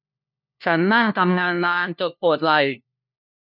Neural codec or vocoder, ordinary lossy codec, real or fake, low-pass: codec, 16 kHz, 1 kbps, FunCodec, trained on LibriTTS, 50 frames a second; none; fake; 5.4 kHz